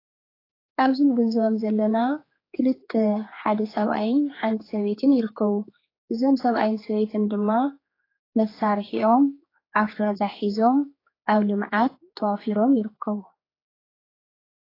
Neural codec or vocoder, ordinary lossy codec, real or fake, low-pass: codec, 16 kHz, 4 kbps, X-Codec, HuBERT features, trained on general audio; AAC, 24 kbps; fake; 5.4 kHz